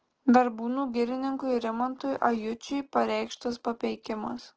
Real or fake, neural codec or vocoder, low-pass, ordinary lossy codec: real; none; 7.2 kHz; Opus, 16 kbps